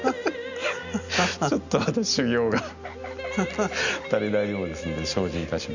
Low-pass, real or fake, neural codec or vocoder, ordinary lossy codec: 7.2 kHz; real; none; none